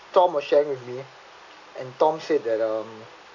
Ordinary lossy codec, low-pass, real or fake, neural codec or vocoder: AAC, 48 kbps; 7.2 kHz; real; none